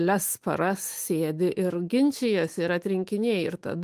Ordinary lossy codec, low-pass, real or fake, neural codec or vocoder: Opus, 24 kbps; 14.4 kHz; fake; autoencoder, 48 kHz, 128 numbers a frame, DAC-VAE, trained on Japanese speech